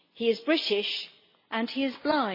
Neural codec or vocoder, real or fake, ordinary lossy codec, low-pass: none; real; MP3, 24 kbps; 5.4 kHz